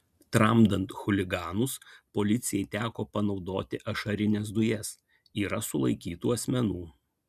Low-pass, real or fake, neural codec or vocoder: 14.4 kHz; real; none